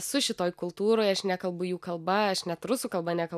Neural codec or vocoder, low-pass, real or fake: none; 14.4 kHz; real